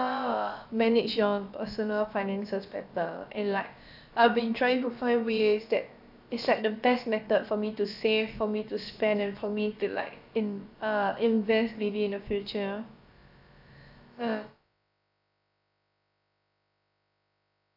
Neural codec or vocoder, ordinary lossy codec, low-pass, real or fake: codec, 16 kHz, about 1 kbps, DyCAST, with the encoder's durations; AAC, 48 kbps; 5.4 kHz; fake